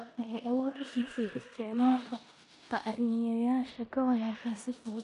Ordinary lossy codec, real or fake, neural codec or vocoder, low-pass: none; fake; codec, 16 kHz in and 24 kHz out, 0.9 kbps, LongCat-Audio-Codec, four codebook decoder; 10.8 kHz